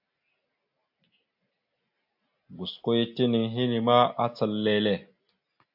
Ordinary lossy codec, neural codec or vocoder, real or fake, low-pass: AAC, 48 kbps; none; real; 5.4 kHz